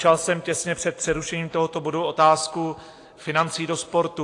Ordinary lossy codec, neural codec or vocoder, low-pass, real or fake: AAC, 48 kbps; none; 10.8 kHz; real